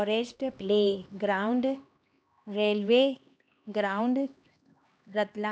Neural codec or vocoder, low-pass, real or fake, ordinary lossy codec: codec, 16 kHz, 2 kbps, X-Codec, HuBERT features, trained on LibriSpeech; none; fake; none